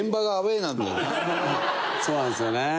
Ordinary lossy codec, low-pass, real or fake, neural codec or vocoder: none; none; real; none